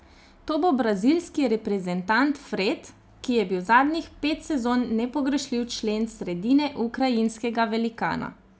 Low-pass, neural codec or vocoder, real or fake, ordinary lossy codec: none; none; real; none